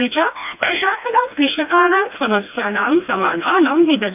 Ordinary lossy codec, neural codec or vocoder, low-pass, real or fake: none; codec, 16 kHz, 1 kbps, FreqCodec, smaller model; 3.6 kHz; fake